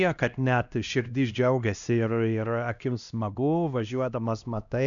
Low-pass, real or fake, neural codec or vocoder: 7.2 kHz; fake; codec, 16 kHz, 1 kbps, X-Codec, HuBERT features, trained on LibriSpeech